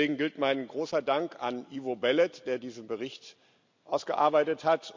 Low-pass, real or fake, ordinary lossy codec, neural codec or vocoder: 7.2 kHz; real; MP3, 64 kbps; none